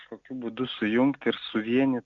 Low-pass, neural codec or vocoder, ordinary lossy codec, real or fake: 7.2 kHz; none; Opus, 64 kbps; real